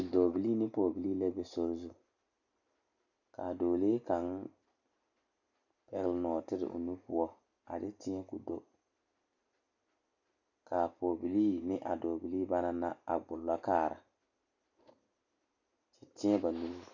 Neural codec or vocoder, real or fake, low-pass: none; real; 7.2 kHz